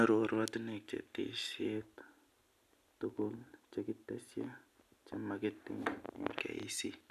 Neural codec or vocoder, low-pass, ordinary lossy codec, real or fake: none; 14.4 kHz; none; real